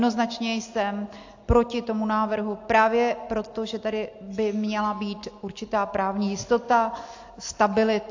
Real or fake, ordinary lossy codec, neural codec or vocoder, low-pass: real; AAC, 48 kbps; none; 7.2 kHz